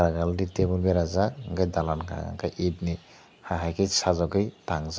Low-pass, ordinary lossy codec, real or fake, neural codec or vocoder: none; none; real; none